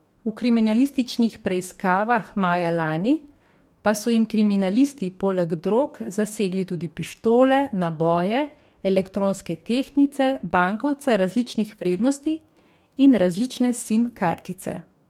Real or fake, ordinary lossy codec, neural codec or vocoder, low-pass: fake; MP3, 96 kbps; codec, 44.1 kHz, 2.6 kbps, DAC; 19.8 kHz